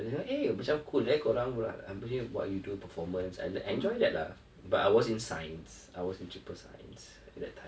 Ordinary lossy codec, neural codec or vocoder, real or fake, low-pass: none; none; real; none